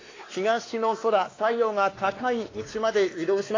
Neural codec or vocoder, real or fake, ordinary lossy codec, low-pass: codec, 16 kHz, 2 kbps, X-Codec, WavLM features, trained on Multilingual LibriSpeech; fake; AAC, 32 kbps; 7.2 kHz